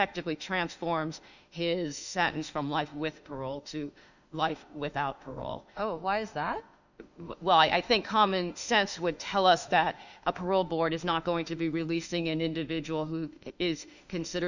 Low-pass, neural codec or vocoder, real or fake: 7.2 kHz; autoencoder, 48 kHz, 32 numbers a frame, DAC-VAE, trained on Japanese speech; fake